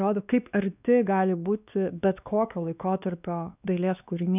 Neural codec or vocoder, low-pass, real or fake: codec, 16 kHz, 2 kbps, X-Codec, WavLM features, trained on Multilingual LibriSpeech; 3.6 kHz; fake